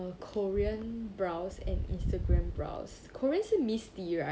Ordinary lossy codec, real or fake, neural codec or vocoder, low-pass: none; real; none; none